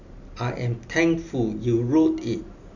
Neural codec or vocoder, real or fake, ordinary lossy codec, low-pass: none; real; none; 7.2 kHz